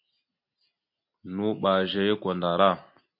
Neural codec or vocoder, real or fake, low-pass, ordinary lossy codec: none; real; 5.4 kHz; MP3, 32 kbps